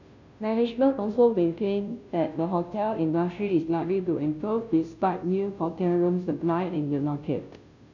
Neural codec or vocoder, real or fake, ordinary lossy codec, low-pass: codec, 16 kHz, 0.5 kbps, FunCodec, trained on Chinese and English, 25 frames a second; fake; none; 7.2 kHz